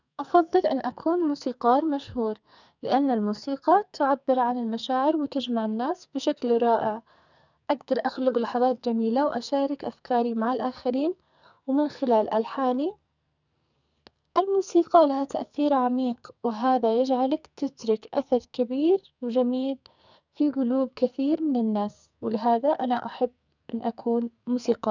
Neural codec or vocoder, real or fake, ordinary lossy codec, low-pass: codec, 44.1 kHz, 2.6 kbps, SNAC; fake; none; 7.2 kHz